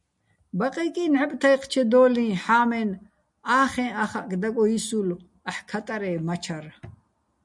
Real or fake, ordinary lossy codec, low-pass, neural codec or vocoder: real; MP3, 96 kbps; 10.8 kHz; none